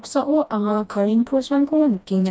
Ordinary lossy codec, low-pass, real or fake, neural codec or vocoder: none; none; fake; codec, 16 kHz, 1 kbps, FreqCodec, smaller model